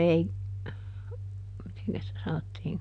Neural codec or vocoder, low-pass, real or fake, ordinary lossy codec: none; 9.9 kHz; real; none